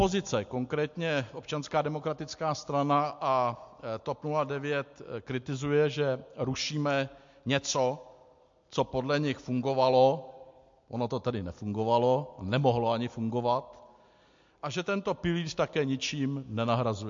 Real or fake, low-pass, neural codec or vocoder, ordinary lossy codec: real; 7.2 kHz; none; MP3, 48 kbps